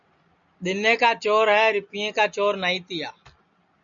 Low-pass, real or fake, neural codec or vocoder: 7.2 kHz; real; none